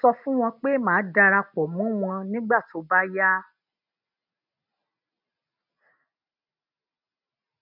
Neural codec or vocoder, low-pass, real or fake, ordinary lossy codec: none; 5.4 kHz; real; none